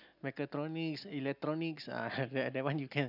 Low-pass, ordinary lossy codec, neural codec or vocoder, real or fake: 5.4 kHz; none; none; real